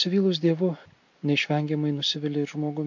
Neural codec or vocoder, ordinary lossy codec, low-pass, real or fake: none; MP3, 48 kbps; 7.2 kHz; real